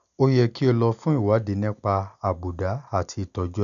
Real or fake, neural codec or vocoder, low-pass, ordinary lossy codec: real; none; 7.2 kHz; none